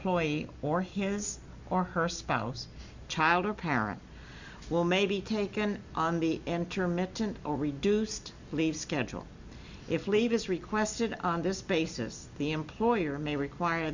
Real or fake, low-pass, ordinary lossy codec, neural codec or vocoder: real; 7.2 kHz; Opus, 64 kbps; none